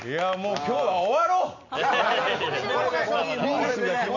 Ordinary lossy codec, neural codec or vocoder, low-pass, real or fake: none; none; 7.2 kHz; real